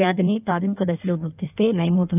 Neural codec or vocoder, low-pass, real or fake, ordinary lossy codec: codec, 16 kHz in and 24 kHz out, 1.1 kbps, FireRedTTS-2 codec; 3.6 kHz; fake; none